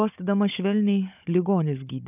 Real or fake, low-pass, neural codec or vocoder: fake; 3.6 kHz; codec, 16 kHz, 16 kbps, FunCodec, trained on Chinese and English, 50 frames a second